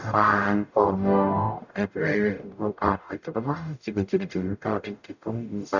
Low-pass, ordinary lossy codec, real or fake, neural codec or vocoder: 7.2 kHz; none; fake; codec, 44.1 kHz, 0.9 kbps, DAC